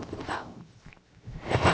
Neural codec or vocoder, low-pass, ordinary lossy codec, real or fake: codec, 16 kHz, 0.7 kbps, FocalCodec; none; none; fake